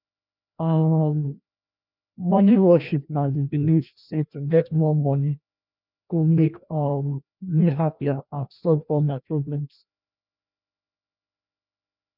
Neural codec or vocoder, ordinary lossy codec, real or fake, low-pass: codec, 16 kHz, 1 kbps, FreqCodec, larger model; none; fake; 5.4 kHz